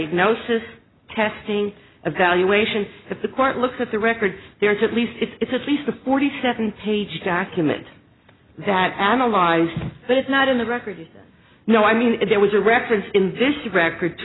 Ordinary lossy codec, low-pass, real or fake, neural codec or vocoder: AAC, 16 kbps; 7.2 kHz; real; none